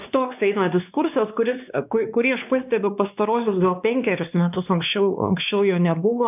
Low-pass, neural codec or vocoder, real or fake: 3.6 kHz; codec, 16 kHz, 2 kbps, X-Codec, WavLM features, trained on Multilingual LibriSpeech; fake